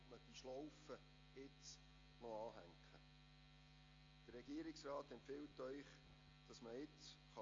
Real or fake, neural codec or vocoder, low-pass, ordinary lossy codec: real; none; 7.2 kHz; AAC, 32 kbps